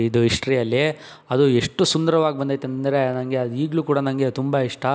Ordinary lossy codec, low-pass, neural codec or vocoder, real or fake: none; none; none; real